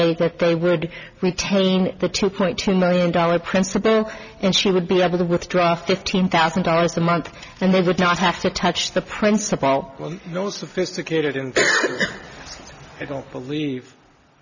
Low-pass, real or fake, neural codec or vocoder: 7.2 kHz; real; none